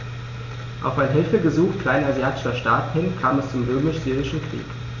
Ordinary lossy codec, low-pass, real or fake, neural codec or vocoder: none; 7.2 kHz; real; none